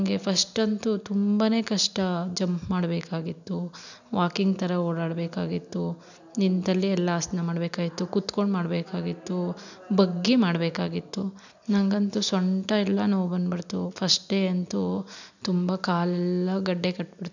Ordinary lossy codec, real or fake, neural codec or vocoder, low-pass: none; real; none; 7.2 kHz